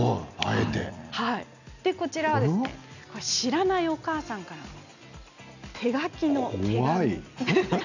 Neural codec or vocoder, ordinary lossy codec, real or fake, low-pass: none; none; real; 7.2 kHz